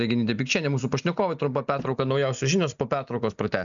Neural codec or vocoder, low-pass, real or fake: none; 7.2 kHz; real